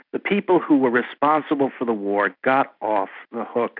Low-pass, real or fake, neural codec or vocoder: 5.4 kHz; real; none